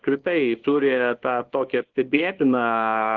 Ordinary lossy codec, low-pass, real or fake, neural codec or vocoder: Opus, 16 kbps; 7.2 kHz; fake; codec, 24 kHz, 0.9 kbps, WavTokenizer, small release